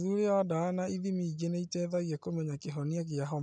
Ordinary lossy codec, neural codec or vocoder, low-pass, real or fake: none; none; 9.9 kHz; real